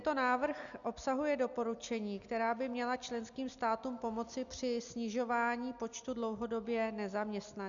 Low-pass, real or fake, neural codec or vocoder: 7.2 kHz; real; none